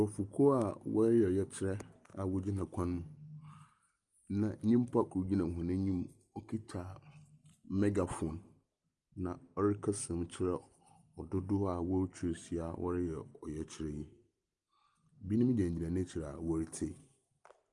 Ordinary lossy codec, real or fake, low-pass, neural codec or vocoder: Opus, 32 kbps; real; 10.8 kHz; none